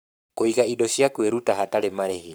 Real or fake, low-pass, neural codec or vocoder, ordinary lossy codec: fake; none; codec, 44.1 kHz, 7.8 kbps, Pupu-Codec; none